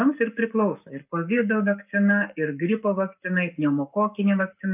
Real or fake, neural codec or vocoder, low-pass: fake; codec, 44.1 kHz, 7.8 kbps, Pupu-Codec; 3.6 kHz